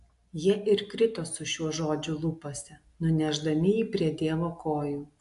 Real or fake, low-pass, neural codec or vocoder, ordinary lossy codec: real; 10.8 kHz; none; AAC, 64 kbps